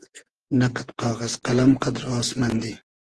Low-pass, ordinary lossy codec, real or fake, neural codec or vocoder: 10.8 kHz; Opus, 16 kbps; fake; vocoder, 48 kHz, 128 mel bands, Vocos